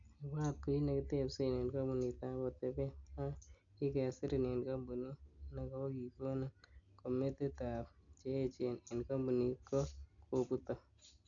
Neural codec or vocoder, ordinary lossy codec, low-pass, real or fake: none; none; 7.2 kHz; real